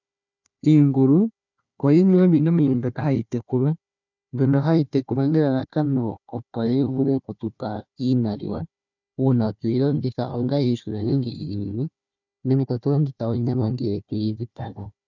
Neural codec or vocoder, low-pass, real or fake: codec, 16 kHz, 1 kbps, FunCodec, trained on Chinese and English, 50 frames a second; 7.2 kHz; fake